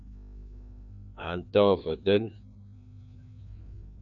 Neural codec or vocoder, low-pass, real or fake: codec, 16 kHz, 2 kbps, FreqCodec, larger model; 7.2 kHz; fake